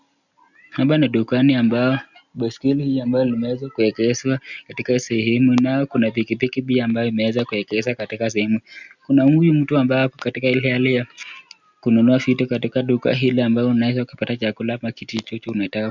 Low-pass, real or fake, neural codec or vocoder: 7.2 kHz; real; none